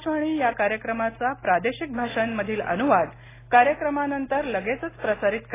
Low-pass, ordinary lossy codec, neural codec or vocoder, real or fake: 3.6 kHz; AAC, 16 kbps; none; real